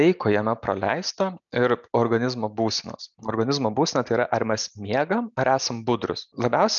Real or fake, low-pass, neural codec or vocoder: real; 7.2 kHz; none